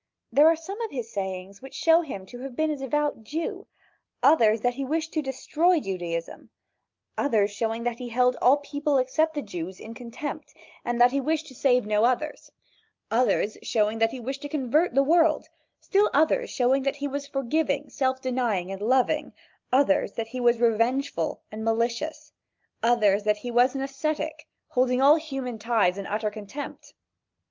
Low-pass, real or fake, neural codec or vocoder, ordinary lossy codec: 7.2 kHz; real; none; Opus, 24 kbps